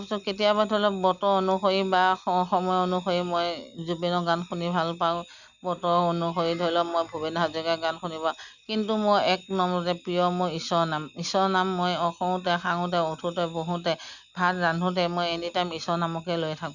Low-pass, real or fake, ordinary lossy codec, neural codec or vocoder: 7.2 kHz; real; none; none